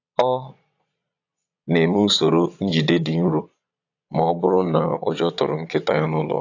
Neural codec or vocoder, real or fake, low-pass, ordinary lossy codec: vocoder, 22.05 kHz, 80 mel bands, Vocos; fake; 7.2 kHz; AAC, 48 kbps